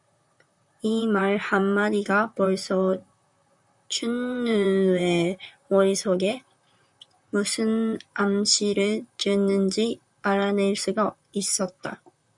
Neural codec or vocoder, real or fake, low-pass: vocoder, 44.1 kHz, 128 mel bands, Pupu-Vocoder; fake; 10.8 kHz